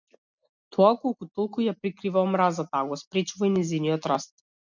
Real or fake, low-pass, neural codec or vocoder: real; 7.2 kHz; none